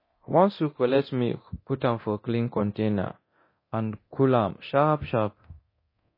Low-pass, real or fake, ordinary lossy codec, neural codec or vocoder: 5.4 kHz; fake; MP3, 24 kbps; codec, 24 kHz, 0.9 kbps, DualCodec